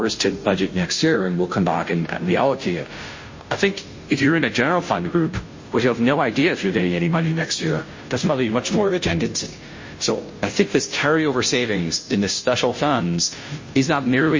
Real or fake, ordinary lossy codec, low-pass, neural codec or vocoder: fake; MP3, 32 kbps; 7.2 kHz; codec, 16 kHz, 0.5 kbps, FunCodec, trained on Chinese and English, 25 frames a second